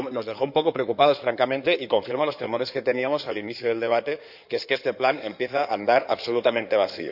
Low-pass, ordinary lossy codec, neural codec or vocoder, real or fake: 5.4 kHz; none; codec, 16 kHz in and 24 kHz out, 2.2 kbps, FireRedTTS-2 codec; fake